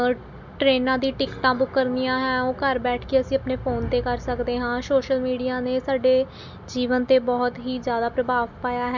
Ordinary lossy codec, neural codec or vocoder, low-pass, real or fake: MP3, 64 kbps; none; 7.2 kHz; real